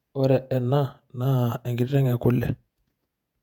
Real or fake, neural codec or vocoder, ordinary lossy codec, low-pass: fake; vocoder, 48 kHz, 128 mel bands, Vocos; none; 19.8 kHz